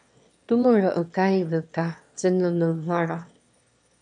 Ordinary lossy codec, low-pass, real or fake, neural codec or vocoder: MP3, 64 kbps; 9.9 kHz; fake; autoencoder, 22.05 kHz, a latent of 192 numbers a frame, VITS, trained on one speaker